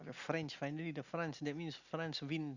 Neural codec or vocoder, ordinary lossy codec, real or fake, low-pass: none; Opus, 64 kbps; real; 7.2 kHz